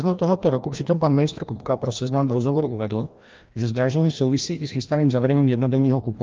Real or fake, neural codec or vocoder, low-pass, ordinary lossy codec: fake; codec, 16 kHz, 1 kbps, FreqCodec, larger model; 7.2 kHz; Opus, 32 kbps